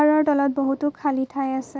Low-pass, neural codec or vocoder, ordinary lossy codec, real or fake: none; none; none; real